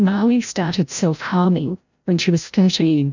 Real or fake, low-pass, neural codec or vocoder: fake; 7.2 kHz; codec, 16 kHz, 0.5 kbps, FreqCodec, larger model